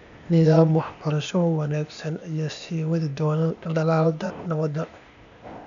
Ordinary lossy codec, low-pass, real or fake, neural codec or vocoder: none; 7.2 kHz; fake; codec, 16 kHz, 0.8 kbps, ZipCodec